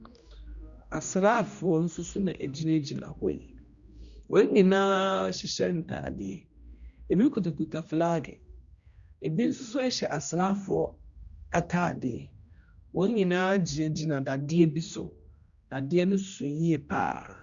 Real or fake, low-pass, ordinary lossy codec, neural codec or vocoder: fake; 7.2 kHz; Opus, 64 kbps; codec, 16 kHz, 1 kbps, X-Codec, HuBERT features, trained on general audio